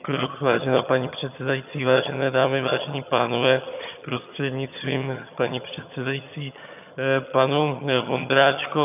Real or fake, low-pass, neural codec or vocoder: fake; 3.6 kHz; vocoder, 22.05 kHz, 80 mel bands, HiFi-GAN